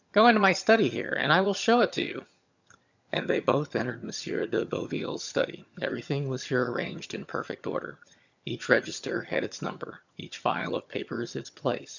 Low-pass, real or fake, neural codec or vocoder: 7.2 kHz; fake; vocoder, 22.05 kHz, 80 mel bands, HiFi-GAN